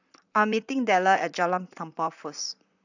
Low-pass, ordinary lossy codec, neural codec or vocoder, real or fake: 7.2 kHz; none; vocoder, 44.1 kHz, 128 mel bands, Pupu-Vocoder; fake